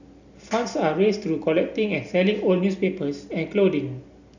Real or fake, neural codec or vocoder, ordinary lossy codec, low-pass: real; none; none; 7.2 kHz